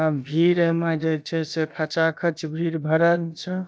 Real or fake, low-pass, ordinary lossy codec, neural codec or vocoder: fake; none; none; codec, 16 kHz, about 1 kbps, DyCAST, with the encoder's durations